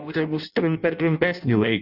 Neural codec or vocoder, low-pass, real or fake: codec, 16 kHz in and 24 kHz out, 0.6 kbps, FireRedTTS-2 codec; 5.4 kHz; fake